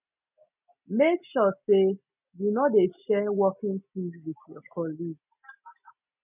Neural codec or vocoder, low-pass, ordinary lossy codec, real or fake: none; 3.6 kHz; none; real